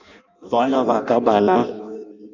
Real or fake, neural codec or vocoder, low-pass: fake; codec, 16 kHz in and 24 kHz out, 0.6 kbps, FireRedTTS-2 codec; 7.2 kHz